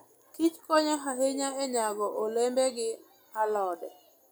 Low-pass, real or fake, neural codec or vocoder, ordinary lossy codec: none; real; none; none